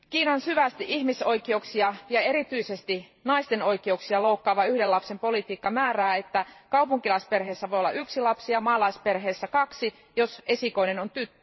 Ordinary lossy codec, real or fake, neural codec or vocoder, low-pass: MP3, 24 kbps; fake; vocoder, 44.1 kHz, 128 mel bands every 512 samples, BigVGAN v2; 7.2 kHz